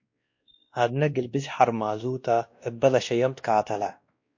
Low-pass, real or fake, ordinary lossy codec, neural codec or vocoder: 7.2 kHz; fake; MP3, 48 kbps; codec, 16 kHz, 1 kbps, X-Codec, WavLM features, trained on Multilingual LibriSpeech